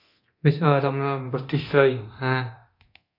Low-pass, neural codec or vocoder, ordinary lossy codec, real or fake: 5.4 kHz; codec, 24 kHz, 0.9 kbps, DualCodec; AAC, 32 kbps; fake